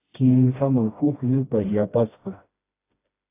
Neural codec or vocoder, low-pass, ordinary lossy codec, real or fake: codec, 16 kHz, 1 kbps, FreqCodec, smaller model; 3.6 kHz; AAC, 24 kbps; fake